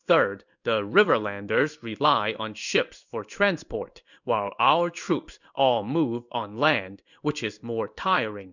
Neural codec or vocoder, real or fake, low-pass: codec, 16 kHz in and 24 kHz out, 1 kbps, XY-Tokenizer; fake; 7.2 kHz